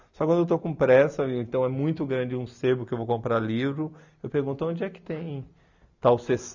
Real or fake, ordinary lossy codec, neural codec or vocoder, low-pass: real; none; none; 7.2 kHz